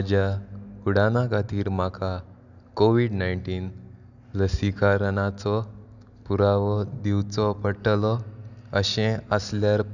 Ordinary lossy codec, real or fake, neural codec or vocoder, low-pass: none; real; none; 7.2 kHz